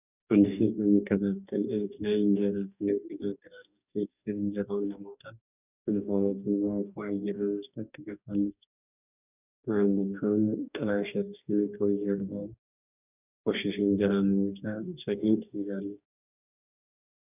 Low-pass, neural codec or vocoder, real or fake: 3.6 kHz; codec, 44.1 kHz, 2.6 kbps, DAC; fake